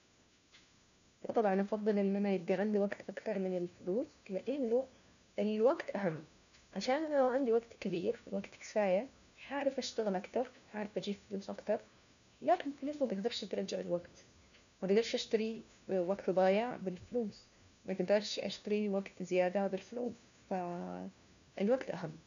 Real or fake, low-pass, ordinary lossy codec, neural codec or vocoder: fake; 7.2 kHz; none; codec, 16 kHz, 1 kbps, FunCodec, trained on LibriTTS, 50 frames a second